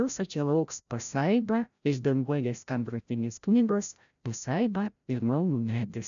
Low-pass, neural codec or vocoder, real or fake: 7.2 kHz; codec, 16 kHz, 0.5 kbps, FreqCodec, larger model; fake